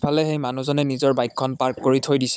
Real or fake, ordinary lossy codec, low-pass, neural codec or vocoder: fake; none; none; codec, 16 kHz, 16 kbps, FunCodec, trained on Chinese and English, 50 frames a second